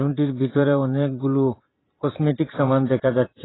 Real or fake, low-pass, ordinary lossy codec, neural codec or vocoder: fake; 7.2 kHz; AAC, 16 kbps; vocoder, 44.1 kHz, 128 mel bands every 512 samples, BigVGAN v2